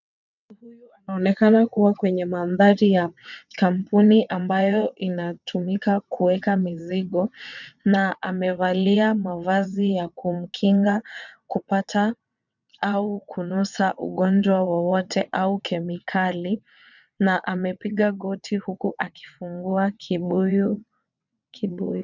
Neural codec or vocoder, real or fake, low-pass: vocoder, 22.05 kHz, 80 mel bands, WaveNeXt; fake; 7.2 kHz